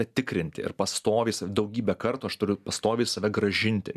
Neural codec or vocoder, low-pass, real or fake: none; 14.4 kHz; real